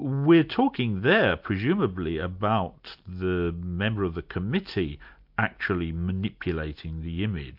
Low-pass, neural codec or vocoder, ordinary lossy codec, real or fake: 5.4 kHz; none; MP3, 48 kbps; real